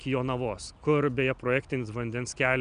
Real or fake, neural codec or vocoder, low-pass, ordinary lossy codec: real; none; 9.9 kHz; MP3, 96 kbps